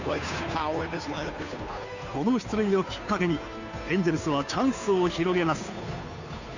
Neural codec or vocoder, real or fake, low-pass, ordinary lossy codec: codec, 16 kHz, 2 kbps, FunCodec, trained on Chinese and English, 25 frames a second; fake; 7.2 kHz; none